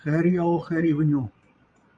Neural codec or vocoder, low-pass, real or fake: vocoder, 22.05 kHz, 80 mel bands, Vocos; 9.9 kHz; fake